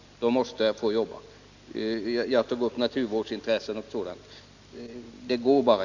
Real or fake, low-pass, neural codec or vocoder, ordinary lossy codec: real; 7.2 kHz; none; none